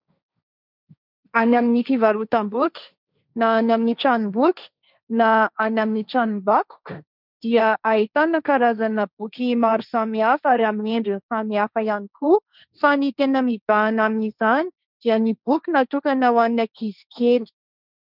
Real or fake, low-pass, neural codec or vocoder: fake; 5.4 kHz; codec, 16 kHz, 1.1 kbps, Voila-Tokenizer